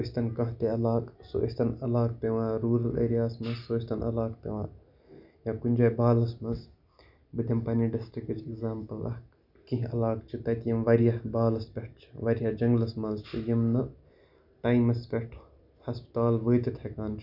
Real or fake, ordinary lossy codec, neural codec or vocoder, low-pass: real; none; none; 5.4 kHz